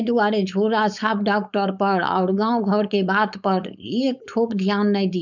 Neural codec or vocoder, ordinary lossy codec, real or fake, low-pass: codec, 16 kHz, 4.8 kbps, FACodec; none; fake; 7.2 kHz